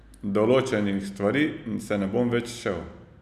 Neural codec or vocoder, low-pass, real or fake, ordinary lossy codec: none; 14.4 kHz; real; none